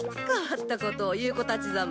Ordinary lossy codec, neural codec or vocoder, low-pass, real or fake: none; none; none; real